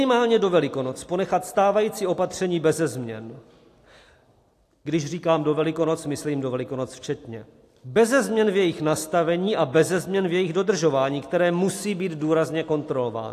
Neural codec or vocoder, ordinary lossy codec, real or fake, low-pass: none; AAC, 64 kbps; real; 14.4 kHz